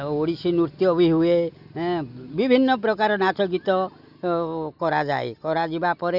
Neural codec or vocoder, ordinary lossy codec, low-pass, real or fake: none; none; 5.4 kHz; real